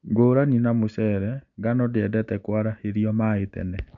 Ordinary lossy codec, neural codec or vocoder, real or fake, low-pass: MP3, 64 kbps; none; real; 7.2 kHz